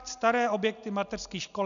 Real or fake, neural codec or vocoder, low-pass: real; none; 7.2 kHz